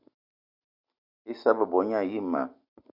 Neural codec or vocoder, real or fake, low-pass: none; real; 5.4 kHz